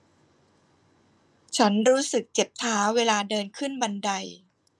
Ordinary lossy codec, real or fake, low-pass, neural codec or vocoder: none; fake; none; vocoder, 24 kHz, 100 mel bands, Vocos